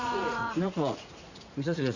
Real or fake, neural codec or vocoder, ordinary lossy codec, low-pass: fake; vocoder, 44.1 kHz, 80 mel bands, Vocos; none; 7.2 kHz